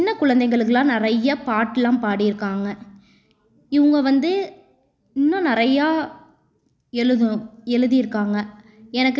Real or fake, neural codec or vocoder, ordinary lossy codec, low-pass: real; none; none; none